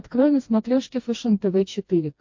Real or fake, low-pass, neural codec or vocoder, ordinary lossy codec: fake; 7.2 kHz; codec, 16 kHz, 2 kbps, FreqCodec, smaller model; MP3, 48 kbps